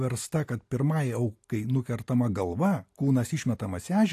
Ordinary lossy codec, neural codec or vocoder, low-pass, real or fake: AAC, 64 kbps; none; 14.4 kHz; real